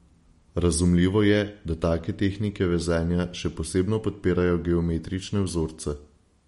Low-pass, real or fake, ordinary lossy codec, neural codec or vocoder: 19.8 kHz; real; MP3, 48 kbps; none